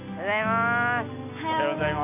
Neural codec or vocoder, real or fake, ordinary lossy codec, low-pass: none; real; none; 3.6 kHz